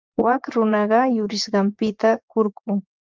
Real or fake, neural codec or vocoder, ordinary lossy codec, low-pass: fake; vocoder, 24 kHz, 100 mel bands, Vocos; Opus, 32 kbps; 7.2 kHz